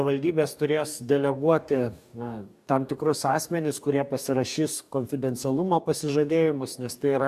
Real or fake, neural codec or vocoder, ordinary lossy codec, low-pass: fake; codec, 44.1 kHz, 2.6 kbps, DAC; AAC, 96 kbps; 14.4 kHz